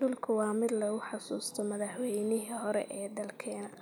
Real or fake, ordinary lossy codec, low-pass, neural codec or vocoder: real; none; none; none